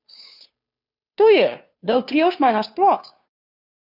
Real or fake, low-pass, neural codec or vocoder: fake; 5.4 kHz; codec, 16 kHz, 2 kbps, FunCodec, trained on Chinese and English, 25 frames a second